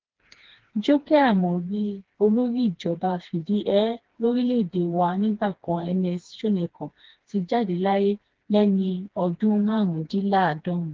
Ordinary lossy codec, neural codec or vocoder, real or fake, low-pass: Opus, 16 kbps; codec, 16 kHz, 2 kbps, FreqCodec, smaller model; fake; 7.2 kHz